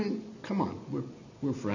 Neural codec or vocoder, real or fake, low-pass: none; real; 7.2 kHz